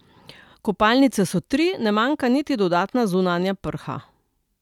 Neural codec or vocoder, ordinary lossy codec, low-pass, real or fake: none; none; 19.8 kHz; real